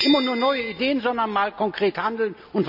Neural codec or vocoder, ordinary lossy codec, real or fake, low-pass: none; none; real; 5.4 kHz